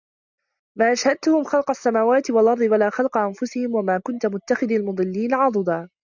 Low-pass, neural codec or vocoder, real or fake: 7.2 kHz; none; real